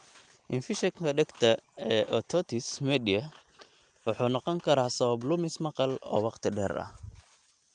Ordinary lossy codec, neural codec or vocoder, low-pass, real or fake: Opus, 32 kbps; none; 9.9 kHz; real